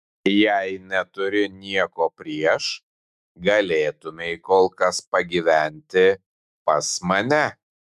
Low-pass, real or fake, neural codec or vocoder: 14.4 kHz; fake; autoencoder, 48 kHz, 128 numbers a frame, DAC-VAE, trained on Japanese speech